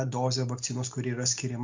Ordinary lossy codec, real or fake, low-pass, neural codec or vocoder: MP3, 64 kbps; real; 7.2 kHz; none